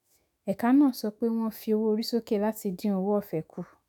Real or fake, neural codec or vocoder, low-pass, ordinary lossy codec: fake; autoencoder, 48 kHz, 128 numbers a frame, DAC-VAE, trained on Japanese speech; none; none